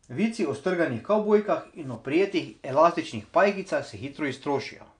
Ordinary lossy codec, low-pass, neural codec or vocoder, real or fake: AAC, 64 kbps; 9.9 kHz; none; real